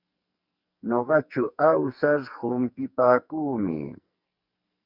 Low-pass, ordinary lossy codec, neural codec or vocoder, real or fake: 5.4 kHz; Opus, 64 kbps; codec, 44.1 kHz, 2.6 kbps, SNAC; fake